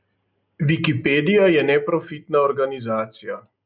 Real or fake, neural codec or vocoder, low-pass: real; none; 5.4 kHz